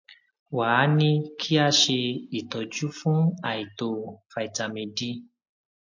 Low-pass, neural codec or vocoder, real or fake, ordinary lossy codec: 7.2 kHz; none; real; MP3, 48 kbps